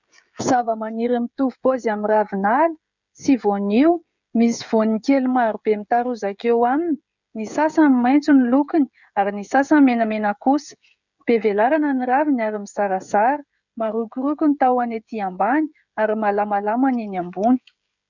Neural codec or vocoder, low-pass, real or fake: codec, 16 kHz, 16 kbps, FreqCodec, smaller model; 7.2 kHz; fake